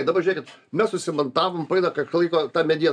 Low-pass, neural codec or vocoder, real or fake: 9.9 kHz; none; real